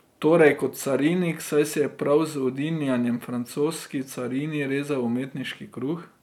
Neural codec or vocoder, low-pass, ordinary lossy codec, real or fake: none; 19.8 kHz; none; real